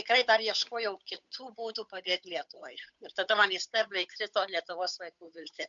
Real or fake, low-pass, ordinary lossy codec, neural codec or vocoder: fake; 7.2 kHz; MP3, 64 kbps; codec, 16 kHz, 8 kbps, FunCodec, trained on Chinese and English, 25 frames a second